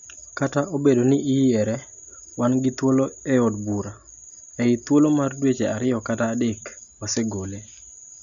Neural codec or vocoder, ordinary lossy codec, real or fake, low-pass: none; none; real; 7.2 kHz